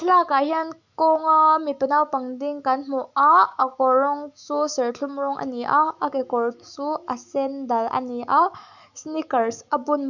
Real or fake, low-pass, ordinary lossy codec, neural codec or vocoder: fake; 7.2 kHz; none; autoencoder, 48 kHz, 128 numbers a frame, DAC-VAE, trained on Japanese speech